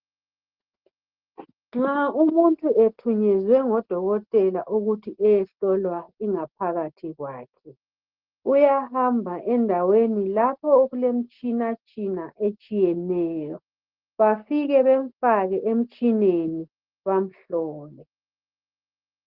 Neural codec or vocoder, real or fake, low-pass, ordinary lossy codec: none; real; 5.4 kHz; Opus, 16 kbps